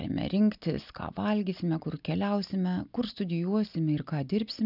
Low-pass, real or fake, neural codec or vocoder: 5.4 kHz; real; none